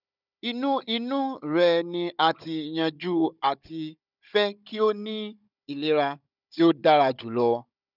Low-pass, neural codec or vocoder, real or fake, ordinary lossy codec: 5.4 kHz; codec, 16 kHz, 16 kbps, FunCodec, trained on Chinese and English, 50 frames a second; fake; none